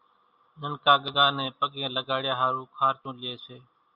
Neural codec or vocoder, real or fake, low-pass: none; real; 5.4 kHz